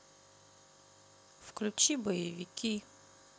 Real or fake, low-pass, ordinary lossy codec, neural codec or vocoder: real; none; none; none